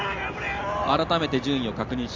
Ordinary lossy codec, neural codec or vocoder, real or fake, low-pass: Opus, 32 kbps; none; real; 7.2 kHz